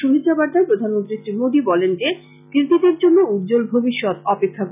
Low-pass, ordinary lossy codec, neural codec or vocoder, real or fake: 3.6 kHz; none; none; real